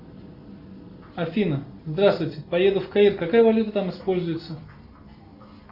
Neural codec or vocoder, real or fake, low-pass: none; real; 5.4 kHz